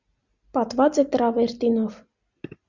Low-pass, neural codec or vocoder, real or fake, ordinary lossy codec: 7.2 kHz; none; real; Opus, 64 kbps